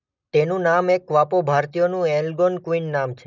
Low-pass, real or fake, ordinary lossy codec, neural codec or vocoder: 7.2 kHz; real; none; none